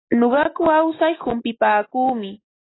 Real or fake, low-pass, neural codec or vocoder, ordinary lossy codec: real; 7.2 kHz; none; AAC, 16 kbps